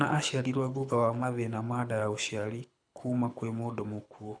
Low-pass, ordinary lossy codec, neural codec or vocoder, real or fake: 9.9 kHz; none; codec, 24 kHz, 6 kbps, HILCodec; fake